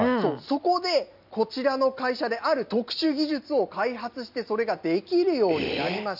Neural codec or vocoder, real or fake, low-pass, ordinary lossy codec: none; real; 5.4 kHz; none